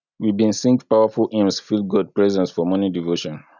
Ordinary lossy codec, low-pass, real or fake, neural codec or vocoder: none; 7.2 kHz; real; none